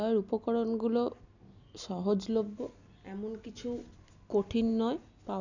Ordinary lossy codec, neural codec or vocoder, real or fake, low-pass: none; none; real; 7.2 kHz